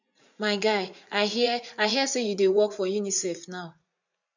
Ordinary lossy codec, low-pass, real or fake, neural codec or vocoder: none; 7.2 kHz; fake; vocoder, 44.1 kHz, 80 mel bands, Vocos